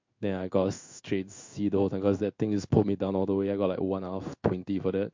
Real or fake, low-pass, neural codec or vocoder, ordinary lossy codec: fake; 7.2 kHz; codec, 16 kHz in and 24 kHz out, 1 kbps, XY-Tokenizer; MP3, 48 kbps